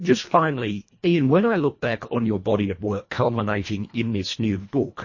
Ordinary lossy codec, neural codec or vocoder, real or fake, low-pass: MP3, 32 kbps; codec, 24 kHz, 1.5 kbps, HILCodec; fake; 7.2 kHz